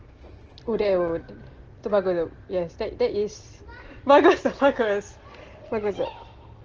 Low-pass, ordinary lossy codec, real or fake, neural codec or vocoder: 7.2 kHz; Opus, 16 kbps; real; none